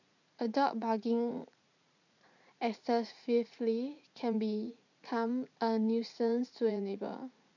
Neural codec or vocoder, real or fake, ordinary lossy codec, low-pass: vocoder, 44.1 kHz, 80 mel bands, Vocos; fake; none; 7.2 kHz